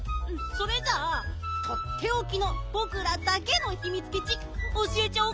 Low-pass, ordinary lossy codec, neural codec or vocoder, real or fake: none; none; none; real